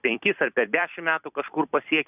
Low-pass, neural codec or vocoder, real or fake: 3.6 kHz; vocoder, 44.1 kHz, 128 mel bands every 256 samples, BigVGAN v2; fake